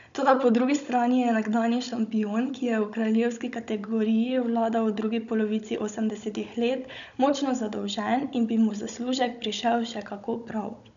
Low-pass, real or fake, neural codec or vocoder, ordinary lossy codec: 7.2 kHz; fake; codec, 16 kHz, 16 kbps, FunCodec, trained on Chinese and English, 50 frames a second; none